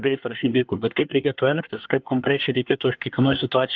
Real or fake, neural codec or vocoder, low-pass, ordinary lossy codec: fake; codec, 24 kHz, 1 kbps, SNAC; 7.2 kHz; Opus, 24 kbps